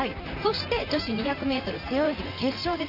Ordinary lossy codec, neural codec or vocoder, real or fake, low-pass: none; vocoder, 44.1 kHz, 128 mel bands, Pupu-Vocoder; fake; 5.4 kHz